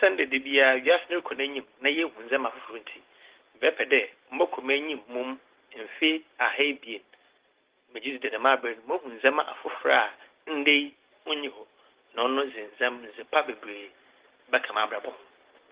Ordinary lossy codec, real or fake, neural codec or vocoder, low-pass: Opus, 16 kbps; real; none; 3.6 kHz